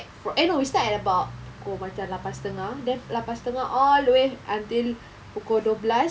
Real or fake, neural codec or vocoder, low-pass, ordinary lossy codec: real; none; none; none